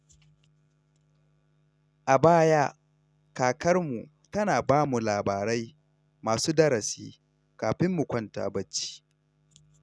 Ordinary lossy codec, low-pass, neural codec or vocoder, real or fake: none; none; none; real